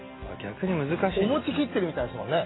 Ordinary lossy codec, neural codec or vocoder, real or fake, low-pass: AAC, 16 kbps; none; real; 7.2 kHz